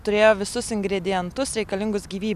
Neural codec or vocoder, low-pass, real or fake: none; 14.4 kHz; real